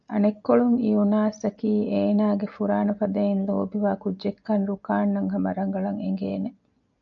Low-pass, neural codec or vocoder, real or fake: 7.2 kHz; none; real